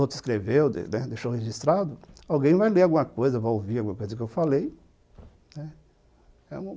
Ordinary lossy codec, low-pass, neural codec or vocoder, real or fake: none; none; none; real